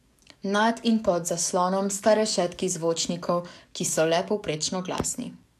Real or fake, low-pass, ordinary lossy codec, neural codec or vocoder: fake; 14.4 kHz; none; codec, 44.1 kHz, 7.8 kbps, DAC